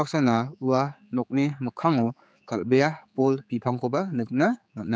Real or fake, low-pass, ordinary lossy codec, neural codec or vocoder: fake; none; none; codec, 16 kHz, 4 kbps, X-Codec, HuBERT features, trained on general audio